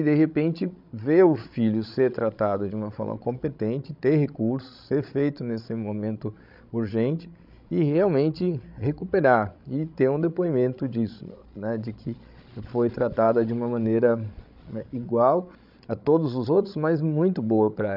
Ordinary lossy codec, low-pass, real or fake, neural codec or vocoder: none; 5.4 kHz; fake; codec, 16 kHz, 16 kbps, FreqCodec, larger model